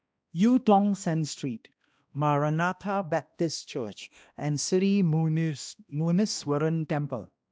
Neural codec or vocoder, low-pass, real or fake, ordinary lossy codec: codec, 16 kHz, 1 kbps, X-Codec, HuBERT features, trained on balanced general audio; none; fake; none